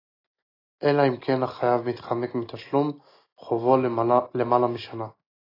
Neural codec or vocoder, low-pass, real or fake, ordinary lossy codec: none; 5.4 kHz; real; AAC, 24 kbps